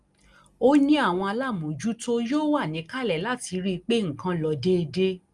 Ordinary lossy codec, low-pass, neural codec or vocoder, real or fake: Opus, 32 kbps; 10.8 kHz; none; real